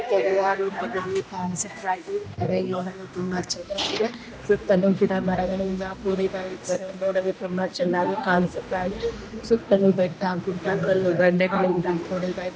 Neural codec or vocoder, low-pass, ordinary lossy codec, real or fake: codec, 16 kHz, 1 kbps, X-Codec, HuBERT features, trained on general audio; none; none; fake